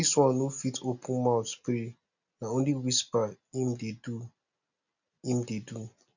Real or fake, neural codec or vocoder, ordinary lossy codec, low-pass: real; none; none; 7.2 kHz